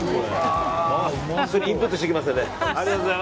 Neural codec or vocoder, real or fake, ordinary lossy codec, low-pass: none; real; none; none